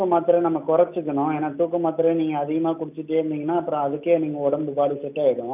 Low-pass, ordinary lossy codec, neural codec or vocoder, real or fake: 3.6 kHz; none; none; real